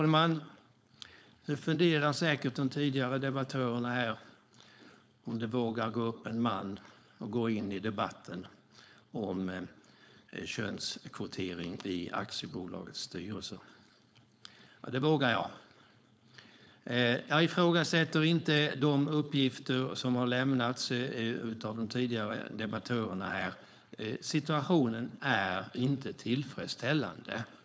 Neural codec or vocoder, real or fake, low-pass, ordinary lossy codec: codec, 16 kHz, 4.8 kbps, FACodec; fake; none; none